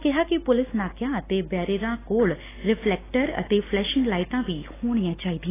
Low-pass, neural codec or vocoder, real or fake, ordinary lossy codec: 3.6 kHz; none; real; AAC, 16 kbps